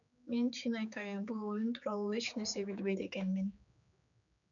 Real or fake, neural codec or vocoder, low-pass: fake; codec, 16 kHz, 4 kbps, X-Codec, HuBERT features, trained on general audio; 7.2 kHz